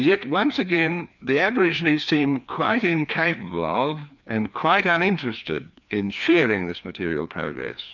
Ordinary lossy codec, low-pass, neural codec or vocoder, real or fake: MP3, 64 kbps; 7.2 kHz; codec, 16 kHz, 2 kbps, FreqCodec, larger model; fake